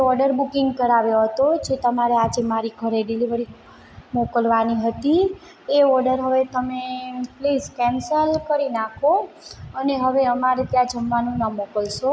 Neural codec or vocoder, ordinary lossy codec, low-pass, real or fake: none; none; none; real